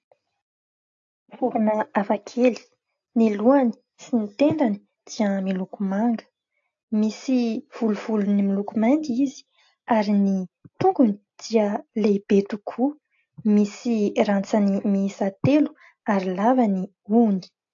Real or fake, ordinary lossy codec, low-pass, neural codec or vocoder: real; AAC, 48 kbps; 7.2 kHz; none